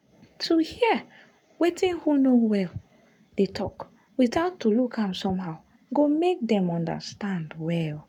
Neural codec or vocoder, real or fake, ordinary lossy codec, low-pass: codec, 44.1 kHz, 7.8 kbps, Pupu-Codec; fake; none; 19.8 kHz